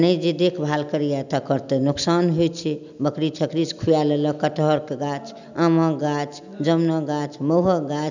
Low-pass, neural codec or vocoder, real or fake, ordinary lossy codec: 7.2 kHz; none; real; none